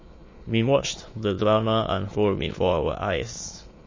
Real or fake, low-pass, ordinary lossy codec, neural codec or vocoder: fake; 7.2 kHz; MP3, 32 kbps; autoencoder, 22.05 kHz, a latent of 192 numbers a frame, VITS, trained on many speakers